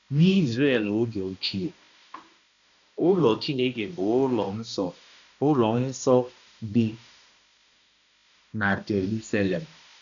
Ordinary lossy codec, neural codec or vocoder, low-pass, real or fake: none; codec, 16 kHz, 1 kbps, X-Codec, HuBERT features, trained on balanced general audio; 7.2 kHz; fake